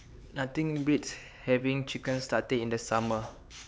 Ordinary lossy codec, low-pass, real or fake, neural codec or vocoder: none; none; fake; codec, 16 kHz, 4 kbps, X-Codec, HuBERT features, trained on LibriSpeech